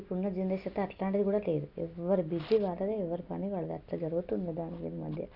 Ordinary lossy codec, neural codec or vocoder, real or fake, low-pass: none; none; real; 5.4 kHz